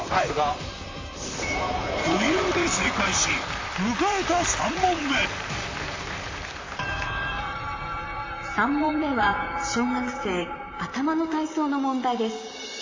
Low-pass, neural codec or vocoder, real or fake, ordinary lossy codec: 7.2 kHz; vocoder, 44.1 kHz, 128 mel bands, Pupu-Vocoder; fake; AAC, 32 kbps